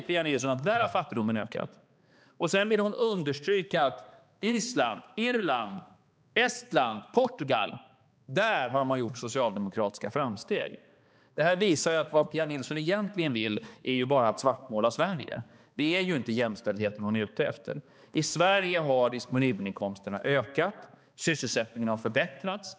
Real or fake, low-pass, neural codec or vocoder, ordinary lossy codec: fake; none; codec, 16 kHz, 2 kbps, X-Codec, HuBERT features, trained on balanced general audio; none